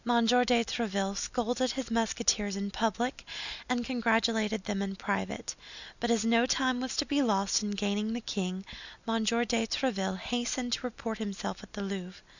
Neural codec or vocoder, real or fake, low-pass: none; real; 7.2 kHz